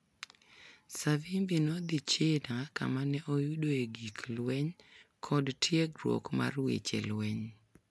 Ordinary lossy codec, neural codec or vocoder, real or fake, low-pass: none; none; real; none